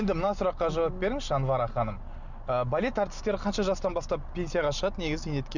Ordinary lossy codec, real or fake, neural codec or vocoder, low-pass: none; real; none; 7.2 kHz